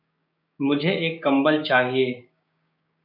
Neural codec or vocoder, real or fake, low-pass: codec, 16 kHz, 6 kbps, DAC; fake; 5.4 kHz